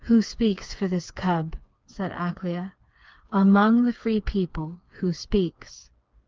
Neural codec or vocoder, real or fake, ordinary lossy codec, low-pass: codec, 16 kHz, 4 kbps, FreqCodec, smaller model; fake; Opus, 32 kbps; 7.2 kHz